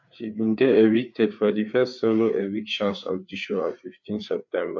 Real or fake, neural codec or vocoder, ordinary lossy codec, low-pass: fake; codec, 16 kHz, 4 kbps, FreqCodec, larger model; none; 7.2 kHz